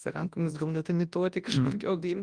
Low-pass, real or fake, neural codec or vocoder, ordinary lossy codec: 9.9 kHz; fake; codec, 24 kHz, 0.9 kbps, WavTokenizer, large speech release; Opus, 32 kbps